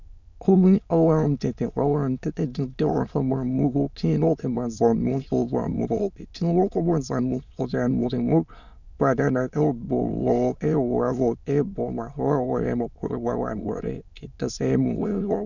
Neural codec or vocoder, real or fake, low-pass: autoencoder, 22.05 kHz, a latent of 192 numbers a frame, VITS, trained on many speakers; fake; 7.2 kHz